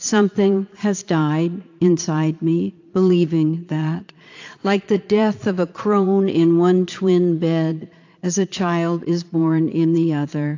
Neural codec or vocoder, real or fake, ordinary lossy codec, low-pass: vocoder, 22.05 kHz, 80 mel bands, Vocos; fake; AAC, 48 kbps; 7.2 kHz